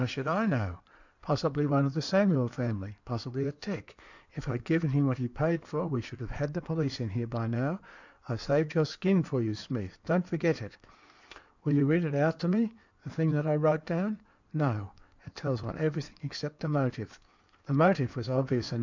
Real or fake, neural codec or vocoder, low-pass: fake; codec, 16 kHz in and 24 kHz out, 2.2 kbps, FireRedTTS-2 codec; 7.2 kHz